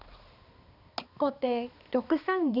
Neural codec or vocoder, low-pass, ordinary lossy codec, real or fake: codec, 16 kHz, 2 kbps, X-Codec, HuBERT features, trained on balanced general audio; 5.4 kHz; none; fake